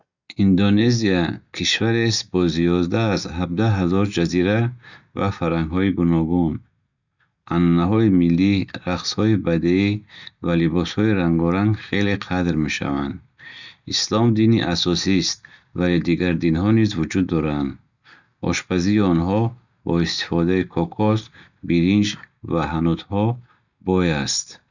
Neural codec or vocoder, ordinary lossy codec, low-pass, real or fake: none; none; 7.2 kHz; real